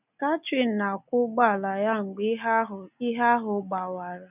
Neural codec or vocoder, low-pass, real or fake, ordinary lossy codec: none; 3.6 kHz; real; none